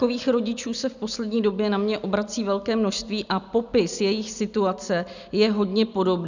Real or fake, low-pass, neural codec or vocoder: real; 7.2 kHz; none